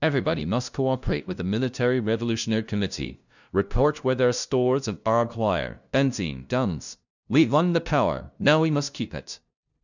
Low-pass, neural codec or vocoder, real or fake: 7.2 kHz; codec, 16 kHz, 0.5 kbps, FunCodec, trained on LibriTTS, 25 frames a second; fake